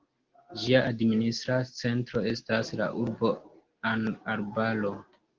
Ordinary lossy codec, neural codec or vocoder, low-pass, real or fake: Opus, 16 kbps; none; 7.2 kHz; real